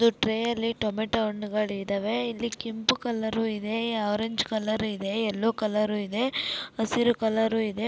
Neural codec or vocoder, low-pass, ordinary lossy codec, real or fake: none; none; none; real